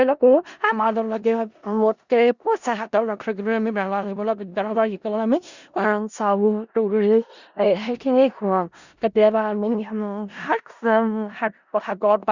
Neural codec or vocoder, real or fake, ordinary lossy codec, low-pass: codec, 16 kHz in and 24 kHz out, 0.4 kbps, LongCat-Audio-Codec, four codebook decoder; fake; Opus, 64 kbps; 7.2 kHz